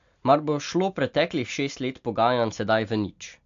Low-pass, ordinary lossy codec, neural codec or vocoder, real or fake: 7.2 kHz; AAC, 64 kbps; none; real